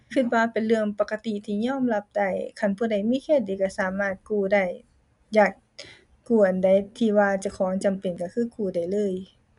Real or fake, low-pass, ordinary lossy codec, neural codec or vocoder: real; 10.8 kHz; none; none